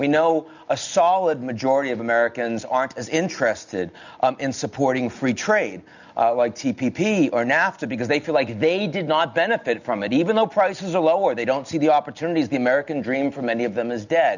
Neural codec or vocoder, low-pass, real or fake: none; 7.2 kHz; real